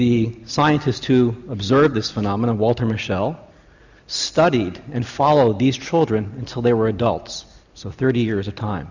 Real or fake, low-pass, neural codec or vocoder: real; 7.2 kHz; none